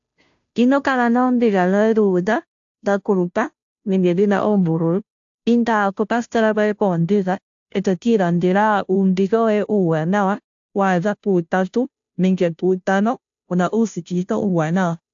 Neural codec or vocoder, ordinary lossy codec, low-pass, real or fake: codec, 16 kHz, 0.5 kbps, FunCodec, trained on Chinese and English, 25 frames a second; AAC, 64 kbps; 7.2 kHz; fake